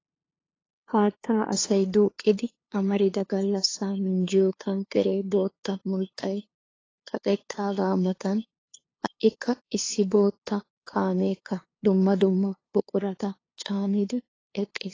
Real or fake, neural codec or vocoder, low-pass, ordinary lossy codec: fake; codec, 16 kHz, 2 kbps, FunCodec, trained on LibriTTS, 25 frames a second; 7.2 kHz; AAC, 32 kbps